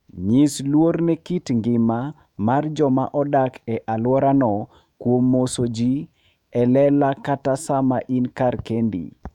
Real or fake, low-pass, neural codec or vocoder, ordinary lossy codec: fake; 19.8 kHz; autoencoder, 48 kHz, 128 numbers a frame, DAC-VAE, trained on Japanese speech; none